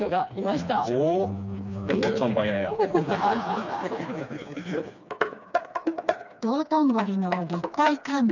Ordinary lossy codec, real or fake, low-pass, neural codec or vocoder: none; fake; 7.2 kHz; codec, 16 kHz, 2 kbps, FreqCodec, smaller model